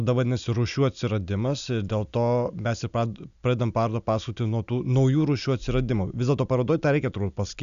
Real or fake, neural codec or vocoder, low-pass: real; none; 7.2 kHz